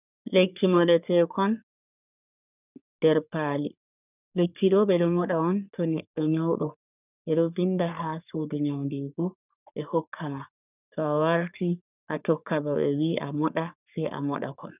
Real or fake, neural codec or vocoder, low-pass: fake; codec, 44.1 kHz, 3.4 kbps, Pupu-Codec; 3.6 kHz